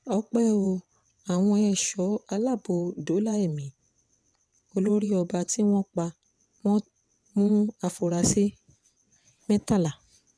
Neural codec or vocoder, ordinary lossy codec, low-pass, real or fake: vocoder, 22.05 kHz, 80 mel bands, WaveNeXt; none; none; fake